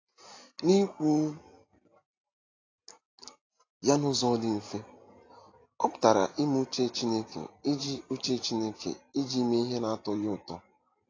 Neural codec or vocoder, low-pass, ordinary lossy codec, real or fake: none; 7.2 kHz; AAC, 32 kbps; real